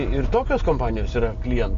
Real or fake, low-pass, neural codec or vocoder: real; 7.2 kHz; none